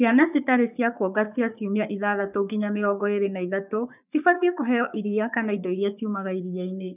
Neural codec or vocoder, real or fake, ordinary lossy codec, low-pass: codec, 44.1 kHz, 3.4 kbps, Pupu-Codec; fake; none; 3.6 kHz